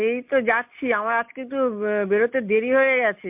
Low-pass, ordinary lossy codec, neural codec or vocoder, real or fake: 3.6 kHz; MP3, 32 kbps; none; real